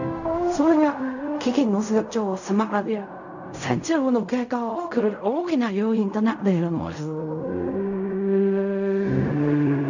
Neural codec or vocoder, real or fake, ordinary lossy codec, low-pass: codec, 16 kHz in and 24 kHz out, 0.4 kbps, LongCat-Audio-Codec, fine tuned four codebook decoder; fake; none; 7.2 kHz